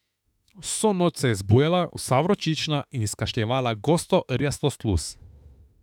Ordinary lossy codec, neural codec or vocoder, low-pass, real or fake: none; autoencoder, 48 kHz, 32 numbers a frame, DAC-VAE, trained on Japanese speech; 19.8 kHz; fake